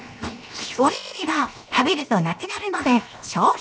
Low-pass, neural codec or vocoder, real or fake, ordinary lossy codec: none; codec, 16 kHz, 0.7 kbps, FocalCodec; fake; none